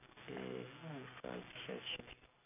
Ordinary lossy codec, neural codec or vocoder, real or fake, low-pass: none; none; real; 3.6 kHz